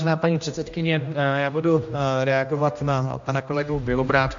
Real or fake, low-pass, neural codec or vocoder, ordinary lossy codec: fake; 7.2 kHz; codec, 16 kHz, 1 kbps, X-Codec, HuBERT features, trained on general audio; MP3, 48 kbps